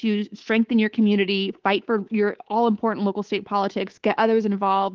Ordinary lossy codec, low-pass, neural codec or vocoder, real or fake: Opus, 16 kbps; 7.2 kHz; codec, 24 kHz, 3.1 kbps, DualCodec; fake